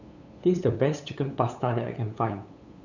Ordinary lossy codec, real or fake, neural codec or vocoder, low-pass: none; fake; codec, 16 kHz, 8 kbps, FunCodec, trained on LibriTTS, 25 frames a second; 7.2 kHz